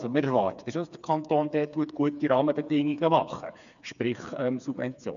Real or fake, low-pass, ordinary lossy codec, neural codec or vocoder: fake; 7.2 kHz; none; codec, 16 kHz, 4 kbps, FreqCodec, smaller model